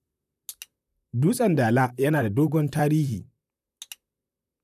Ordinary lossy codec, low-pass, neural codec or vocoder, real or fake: none; 14.4 kHz; vocoder, 44.1 kHz, 128 mel bands, Pupu-Vocoder; fake